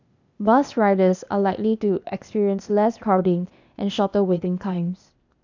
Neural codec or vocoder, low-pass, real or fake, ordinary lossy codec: codec, 16 kHz, 0.8 kbps, ZipCodec; 7.2 kHz; fake; none